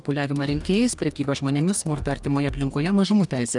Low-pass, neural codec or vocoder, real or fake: 10.8 kHz; codec, 44.1 kHz, 2.6 kbps, DAC; fake